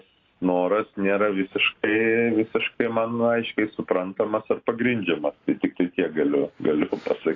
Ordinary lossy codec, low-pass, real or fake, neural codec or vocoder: AAC, 32 kbps; 7.2 kHz; real; none